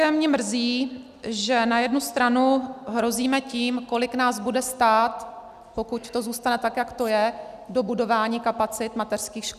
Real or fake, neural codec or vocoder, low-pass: real; none; 14.4 kHz